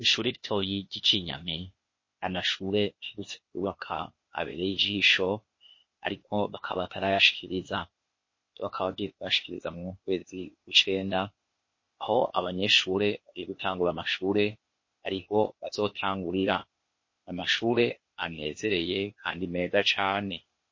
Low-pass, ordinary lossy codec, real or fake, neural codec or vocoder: 7.2 kHz; MP3, 32 kbps; fake; codec, 16 kHz, 0.8 kbps, ZipCodec